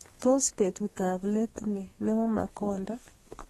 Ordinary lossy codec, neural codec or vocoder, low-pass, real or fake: AAC, 32 kbps; codec, 32 kHz, 1.9 kbps, SNAC; 14.4 kHz; fake